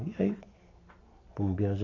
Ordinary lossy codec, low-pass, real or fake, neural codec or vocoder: AAC, 32 kbps; 7.2 kHz; real; none